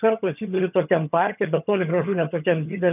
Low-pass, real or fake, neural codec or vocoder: 3.6 kHz; fake; vocoder, 22.05 kHz, 80 mel bands, HiFi-GAN